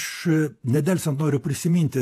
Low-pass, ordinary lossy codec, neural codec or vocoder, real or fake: 14.4 kHz; AAC, 64 kbps; vocoder, 48 kHz, 128 mel bands, Vocos; fake